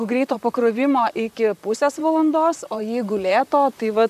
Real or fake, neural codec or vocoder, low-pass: fake; vocoder, 44.1 kHz, 128 mel bands, Pupu-Vocoder; 14.4 kHz